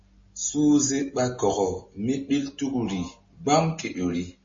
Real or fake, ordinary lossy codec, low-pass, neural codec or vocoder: real; MP3, 32 kbps; 7.2 kHz; none